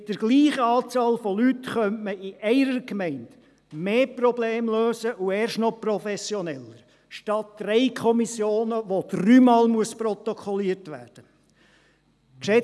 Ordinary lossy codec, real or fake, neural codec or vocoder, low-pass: none; real; none; none